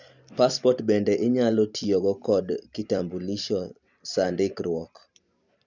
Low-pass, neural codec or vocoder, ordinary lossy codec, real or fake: 7.2 kHz; vocoder, 44.1 kHz, 128 mel bands every 256 samples, BigVGAN v2; none; fake